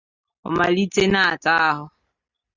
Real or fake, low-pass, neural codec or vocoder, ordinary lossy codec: real; 7.2 kHz; none; Opus, 64 kbps